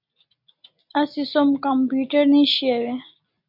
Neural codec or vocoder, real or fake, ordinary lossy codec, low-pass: none; real; MP3, 48 kbps; 5.4 kHz